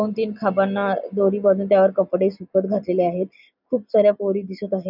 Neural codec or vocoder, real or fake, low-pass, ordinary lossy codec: none; real; 5.4 kHz; none